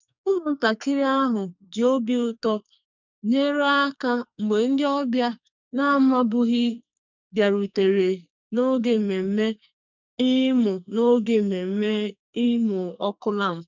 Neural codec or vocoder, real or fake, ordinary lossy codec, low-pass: codec, 44.1 kHz, 2.6 kbps, SNAC; fake; none; 7.2 kHz